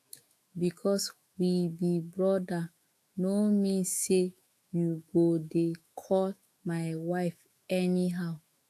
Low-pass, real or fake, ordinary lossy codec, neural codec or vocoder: 14.4 kHz; fake; AAC, 64 kbps; autoencoder, 48 kHz, 128 numbers a frame, DAC-VAE, trained on Japanese speech